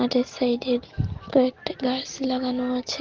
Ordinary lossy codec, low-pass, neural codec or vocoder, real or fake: Opus, 16 kbps; 7.2 kHz; none; real